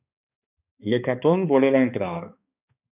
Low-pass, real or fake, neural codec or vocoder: 3.6 kHz; fake; codec, 16 kHz, 2 kbps, X-Codec, HuBERT features, trained on balanced general audio